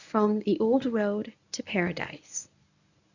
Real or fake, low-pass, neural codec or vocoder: fake; 7.2 kHz; codec, 24 kHz, 0.9 kbps, WavTokenizer, medium speech release version 1